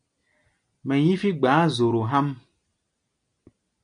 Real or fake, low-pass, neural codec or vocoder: real; 9.9 kHz; none